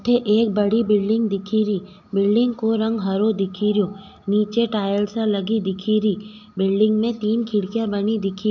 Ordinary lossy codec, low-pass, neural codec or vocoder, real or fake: none; 7.2 kHz; none; real